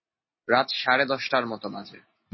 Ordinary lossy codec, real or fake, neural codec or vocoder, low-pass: MP3, 24 kbps; real; none; 7.2 kHz